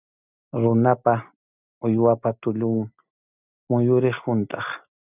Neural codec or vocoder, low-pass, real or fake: none; 3.6 kHz; real